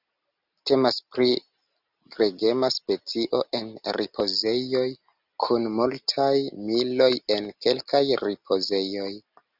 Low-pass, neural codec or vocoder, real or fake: 5.4 kHz; none; real